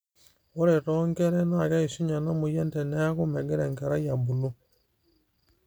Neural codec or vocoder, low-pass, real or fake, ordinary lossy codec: none; none; real; none